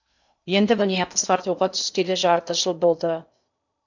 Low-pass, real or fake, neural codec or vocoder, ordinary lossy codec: 7.2 kHz; fake; codec, 16 kHz in and 24 kHz out, 0.8 kbps, FocalCodec, streaming, 65536 codes; none